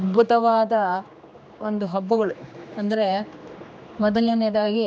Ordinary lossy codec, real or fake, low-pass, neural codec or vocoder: none; fake; none; codec, 16 kHz, 2 kbps, X-Codec, HuBERT features, trained on general audio